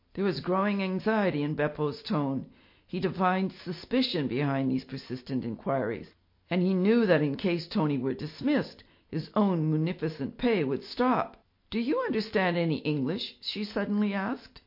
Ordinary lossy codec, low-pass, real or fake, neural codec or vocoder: MP3, 32 kbps; 5.4 kHz; real; none